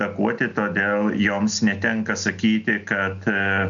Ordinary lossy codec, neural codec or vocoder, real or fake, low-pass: MP3, 96 kbps; none; real; 7.2 kHz